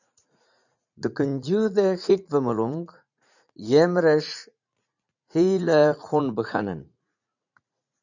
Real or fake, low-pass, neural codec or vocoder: fake; 7.2 kHz; vocoder, 44.1 kHz, 80 mel bands, Vocos